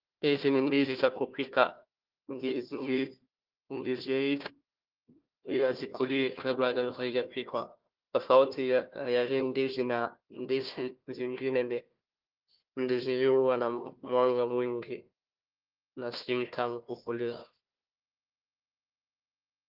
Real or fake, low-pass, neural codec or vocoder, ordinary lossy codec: fake; 5.4 kHz; codec, 16 kHz, 1 kbps, FunCodec, trained on Chinese and English, 50 frames a second; Opus, 32 kbps